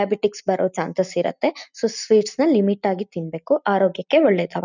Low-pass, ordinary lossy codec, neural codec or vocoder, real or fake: 7.2 kHz; none; none; real